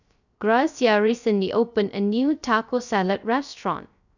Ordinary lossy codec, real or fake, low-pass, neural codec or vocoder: none; fake; 7.2 kHz; codec, 16 kHz, 0.3 kbps, FocalCodec